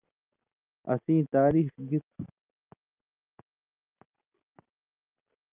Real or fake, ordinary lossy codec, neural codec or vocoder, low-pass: real; Opus, 24 kbps; none; 3.6 kHz